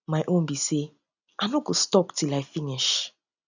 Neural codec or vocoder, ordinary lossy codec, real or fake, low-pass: none; none; real; 7.2 kHz